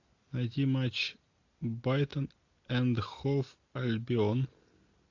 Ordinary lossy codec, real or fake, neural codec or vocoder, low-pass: AAC, 48 kbps; real; none; 7.2 kHz